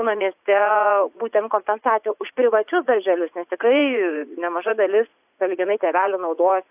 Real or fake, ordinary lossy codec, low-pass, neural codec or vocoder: fake; AAC, 32 kbps; 3.6 kHz; vocoder, 44.1 kHz, 80 mel bands, Vocos